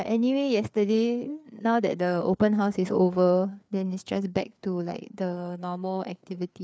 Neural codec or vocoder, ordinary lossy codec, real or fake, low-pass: codec, 16 kHz, 4 kbps, FreqCodec, larger model; none; fake; none